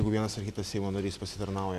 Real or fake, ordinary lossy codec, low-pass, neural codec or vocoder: real; Opus, 64 kbps; 14.4 kHz; none